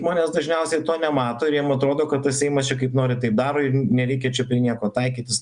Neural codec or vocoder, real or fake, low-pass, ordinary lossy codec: none; real; 9.9 kHz; Opus, 64 kbps